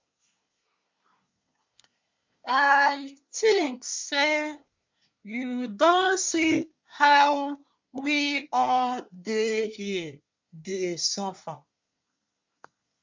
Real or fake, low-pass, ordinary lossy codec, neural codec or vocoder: fake; 7.2 kHz; MP3, 64 kbps; codec, 24 kHz, 1 kbps, SNAC